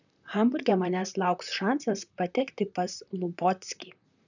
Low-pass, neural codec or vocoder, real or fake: 7.2 kHz; vocoder, 44.1 kHz, 128 mel bands, Pupu-Vocoder; fake